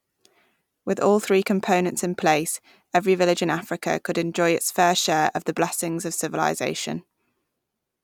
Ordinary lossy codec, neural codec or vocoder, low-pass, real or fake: none; none; 19.8 kHz; real